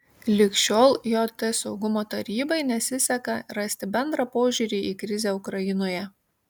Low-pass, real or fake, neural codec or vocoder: 19.8 kHz; real; none